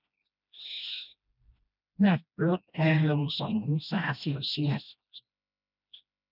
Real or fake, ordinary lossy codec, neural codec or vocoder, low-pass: fake; none; codec, 16 kHz, 1 kbps, FreqCodec, smaller model; 5.4 kHz